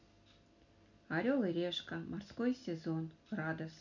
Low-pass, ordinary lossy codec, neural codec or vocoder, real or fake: 7.2 kHz; none; none; real